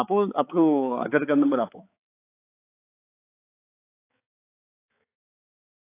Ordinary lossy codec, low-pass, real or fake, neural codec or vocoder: AAC, 24 kbps; 3.6 kHz; fake; codec, 16 kHz, 4 kbps, X-Codec, HuBERT features, trained on balanced general audio